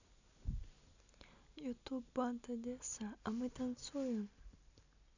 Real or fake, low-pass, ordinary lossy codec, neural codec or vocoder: real; 7.2 kHz; none; none